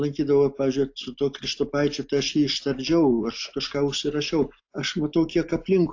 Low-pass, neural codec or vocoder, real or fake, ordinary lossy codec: 7.2 kHz; none; real; AAC, 48 kbps